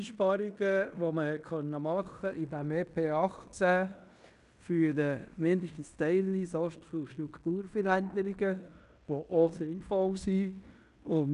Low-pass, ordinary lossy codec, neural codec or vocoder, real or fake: 10.8 kHz; none; codec, 16 kHz in and 24 kHz out, 0.9 kbps, LongCat-Audio-Codec, fine tuned four codebook decoder; fake